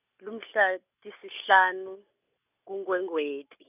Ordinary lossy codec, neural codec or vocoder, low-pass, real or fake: none; none; 3.6 kHz; real